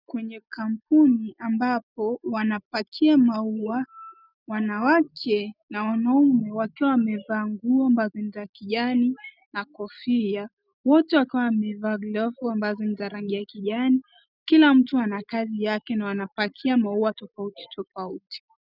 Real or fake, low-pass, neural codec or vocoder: real; 5.4 kHz; none